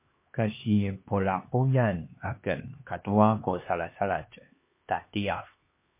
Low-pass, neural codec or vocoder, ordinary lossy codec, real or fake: 3.6 kHz; codec, 16 kHz, 2 kbps, X-Codec, HuBERT features, trained on LibriSpeech; MP3, 24 kbps; fake